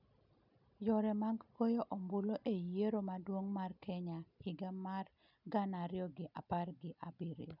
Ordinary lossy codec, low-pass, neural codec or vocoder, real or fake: none; 5.4 kHz; none; real